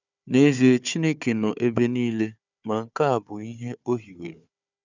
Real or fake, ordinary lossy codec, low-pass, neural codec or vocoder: fake; none; 7.2 kHz; codec, 16 kHz, 4 kbps, FunCodec, trained on Chinese and English, 50 frames a second